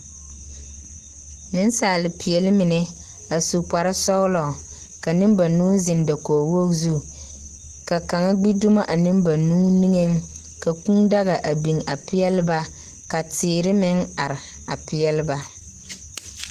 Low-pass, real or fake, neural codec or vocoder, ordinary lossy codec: 14.4 kHz; real; none; Opus, 16 kbps